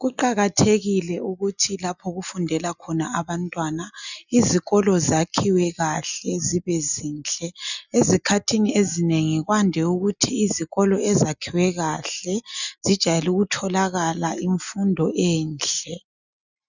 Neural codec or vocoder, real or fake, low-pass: none; real; 7.2 kHz